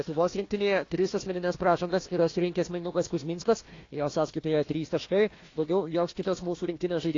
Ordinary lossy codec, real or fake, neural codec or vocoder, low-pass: AAC, 32 kbps; fake; codec, 16 kHz, 1 kbps, FunCodec, trained on Chinese and English, 50 frames a second; 7.2 kHz